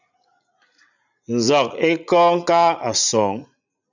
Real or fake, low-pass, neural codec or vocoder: fake; 7.2 kHz; vocoder, 44.1 kHz, 80 mel bands, Vocos